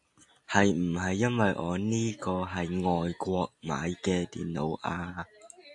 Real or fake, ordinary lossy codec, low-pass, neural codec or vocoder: real; AAC, 64 kbps; 10.8 kHz; none